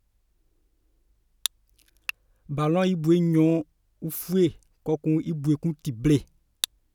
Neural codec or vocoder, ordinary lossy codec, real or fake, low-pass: none; none; real; 19.8 kHz